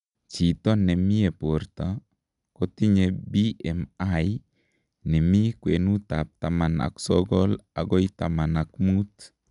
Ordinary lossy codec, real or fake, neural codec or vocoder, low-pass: none; real; none; 10.8 kHz